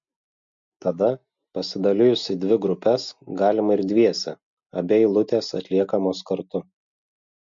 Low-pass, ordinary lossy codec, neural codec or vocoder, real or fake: 7.2 kHz; MP3, 48 kbps; none; real